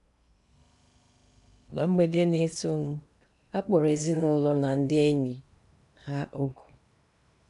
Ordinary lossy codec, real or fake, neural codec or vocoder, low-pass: none; fake; codec, 16 kHz in and 24 kHz out, 0.8 kbps, FocalCodec, streaming, 65536 codes; 10.8 kHz